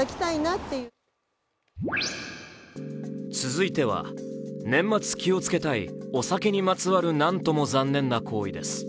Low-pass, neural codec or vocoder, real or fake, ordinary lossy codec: none; none; real; none